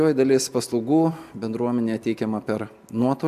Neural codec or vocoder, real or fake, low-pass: none; real; 14.4 kHz